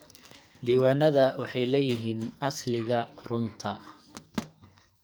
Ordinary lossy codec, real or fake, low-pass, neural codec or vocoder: none; fake; none; codec, 44.1 kHz, 2.6 kbps, SNAC